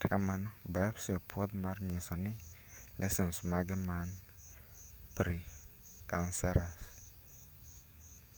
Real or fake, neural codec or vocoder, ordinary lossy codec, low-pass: fake; codec, 44.1 kHz, 7.8 kbps, DAC; none; none